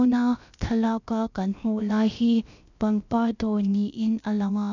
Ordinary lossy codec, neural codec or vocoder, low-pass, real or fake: none; codec, 16 kHz, about 1 kbps, DyCAST, with the encoder's durations; 7.2 kHz; fake